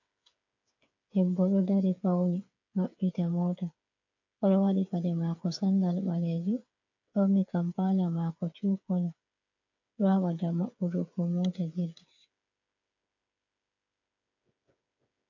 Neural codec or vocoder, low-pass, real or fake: codec, 16 kHz, 8 kbps, FreqCodec, smaller model; 7.2 kHz; fake